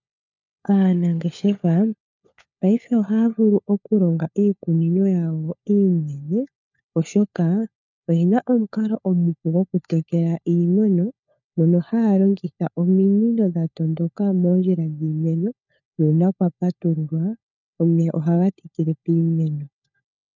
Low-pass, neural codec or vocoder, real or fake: 7.2 kHz; codec, 16 kHz, 4 kbps, FunCodec, trained on LibriTTS, 50 frames a second; fake